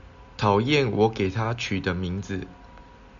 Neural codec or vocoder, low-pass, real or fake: none; 7.2 kHz; real